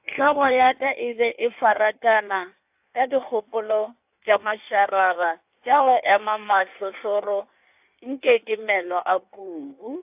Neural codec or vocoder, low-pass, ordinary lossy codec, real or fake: codec, 16 kHz in and 24 kHz out, 1.1 kbps, FireRedTTS-2 codec; 3.6 kHz; AAC, 32 kbps; fake